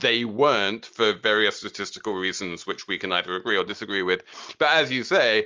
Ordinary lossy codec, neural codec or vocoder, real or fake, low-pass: Opus, 32 kbps; none; real; 7.2 kHz